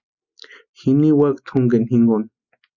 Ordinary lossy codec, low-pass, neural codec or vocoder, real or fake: Opus, 64 kbps; 7.2 kHz; none; real